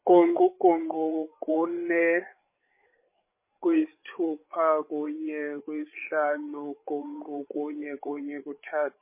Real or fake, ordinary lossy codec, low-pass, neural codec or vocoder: fake; MP3, 24 kbps; 3.6 kHz; codec, 16 kHz, 8 kbps, FreqCodec, larger model